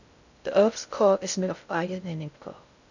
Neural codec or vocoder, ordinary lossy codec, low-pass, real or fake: codec, 16 kHz in and 24 kHz out, 0.6 kbps, FocalCodec, streaming, 2048 codes; none; 7.2 kHz; fake